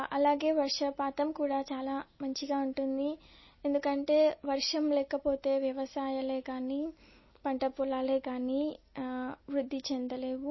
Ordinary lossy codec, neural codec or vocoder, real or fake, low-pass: MP3, 24 kbps; none; real; 7.2 kHz